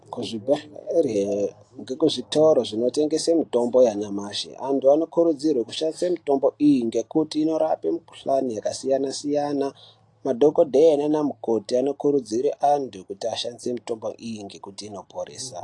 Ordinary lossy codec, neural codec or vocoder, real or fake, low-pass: AAC, 48 kbps; none; real; 10.8 kHz